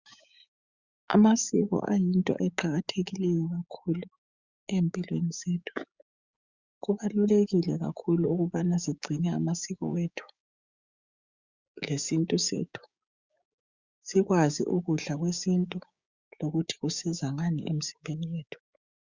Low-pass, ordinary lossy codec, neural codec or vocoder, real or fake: 7.2 kHz; Opus, 64 kbps; codec, 16 kHz, 6 kbps, DAC; fake